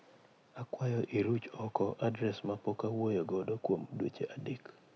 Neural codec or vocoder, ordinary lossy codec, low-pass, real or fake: none; none; none; real